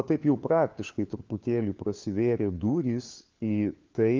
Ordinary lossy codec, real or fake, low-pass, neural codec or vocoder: Opus, 24 kbps; fake; 7.2 kHz; codec, 16 kHz, 4 kbps, FunCodec, trained on LibriTTS, 50 frames a second